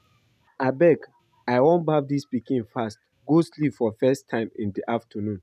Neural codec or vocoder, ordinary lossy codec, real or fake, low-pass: none; none; real; 14.4 kHz